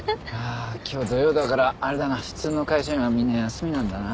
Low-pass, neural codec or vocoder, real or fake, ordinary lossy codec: none; none; real; none